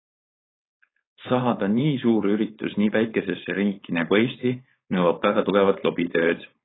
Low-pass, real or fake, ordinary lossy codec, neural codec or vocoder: 7.2 kHz; fake; AAC, 16 kbps; codec, 16 kHz, 4.8 kbps, FACodec